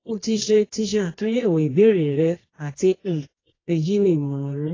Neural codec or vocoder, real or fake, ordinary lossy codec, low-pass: codec, 24 kHz, 0.9 kbps, WavTokenizer, medium music audio release; fake; AAC, 32 kbps; 7.2 kHz